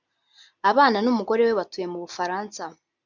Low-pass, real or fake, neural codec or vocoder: 7.2 kHz; real; none